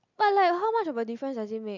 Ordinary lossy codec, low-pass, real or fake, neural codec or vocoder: none; 7.2 kHz; real; none